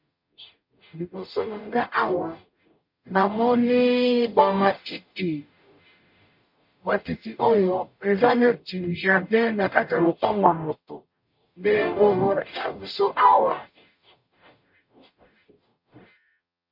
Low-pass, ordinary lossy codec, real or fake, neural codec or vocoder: 5.4 kHz; MP3, 32 kbps; fake; codec, 44.1 kHz, 0.9 kbps, DAC